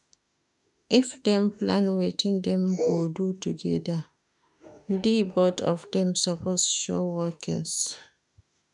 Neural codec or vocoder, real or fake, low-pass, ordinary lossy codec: autoencoder, 48 kHz, 32 numbers a frame, DAC-VAE, trained on Japanese speech; fake; 10.8 kHz; none